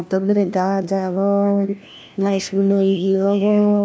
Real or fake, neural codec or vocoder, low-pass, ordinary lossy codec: fake; codec, 16 kHz, 1 kbps, FunCodec, trained on LibriTTS, 50 frames a second; none; none